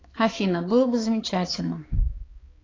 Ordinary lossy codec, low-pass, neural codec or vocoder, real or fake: AAC, 32 kbps; 7.2 kHz; codec, 16 kHz, 4 kbps, X-Codec, HuBERT features, trained on balanced general audio; fake